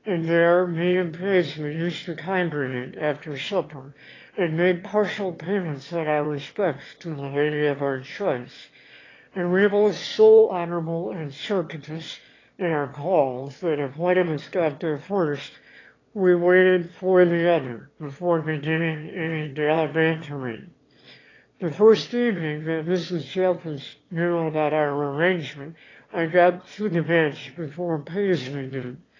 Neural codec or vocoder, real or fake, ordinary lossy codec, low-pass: autoencoder, 22.05 kHz, a latent of 192 numbers a frame, VITS, trained on one speaker; fake; AAC, 32 kbps; 7.2 kHz